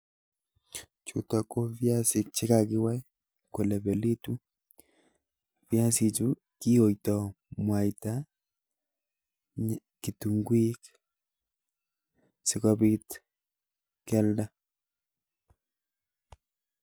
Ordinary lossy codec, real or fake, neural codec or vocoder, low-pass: none; real; none; none